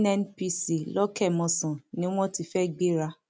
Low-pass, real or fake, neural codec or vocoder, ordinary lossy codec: none; real; none; none